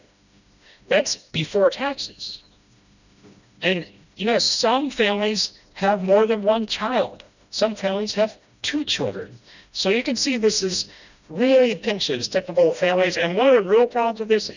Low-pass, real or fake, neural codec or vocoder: 7.2 kHz; fake; codec, 16 kHz, 1 kbps, FreqCodec, smaller model